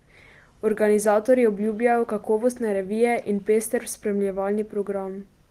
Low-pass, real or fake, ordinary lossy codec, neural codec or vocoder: 14.4 kHz; real; Opus, 24 kbps; none